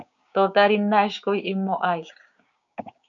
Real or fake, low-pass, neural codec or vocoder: fake; 7.2 kHz; codec, 16 kHz, 6 kbps, DAC